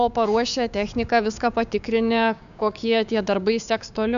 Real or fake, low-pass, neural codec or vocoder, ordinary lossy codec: fake; 7.2 kHz; codec, 16 kHz, 6 kbps, DAC; AAC, 96 kbps